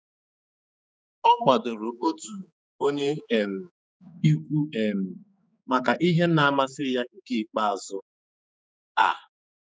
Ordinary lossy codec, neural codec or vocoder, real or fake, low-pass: none; codec, 16 kHz, 4 kbps, X-Codec, HuBERT features, trained on general audio; fake; none